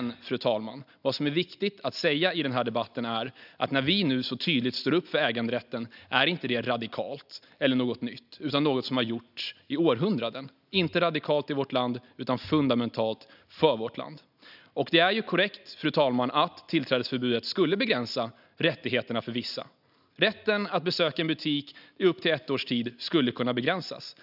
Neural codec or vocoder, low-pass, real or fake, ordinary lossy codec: none; 5.4 kHz; real; none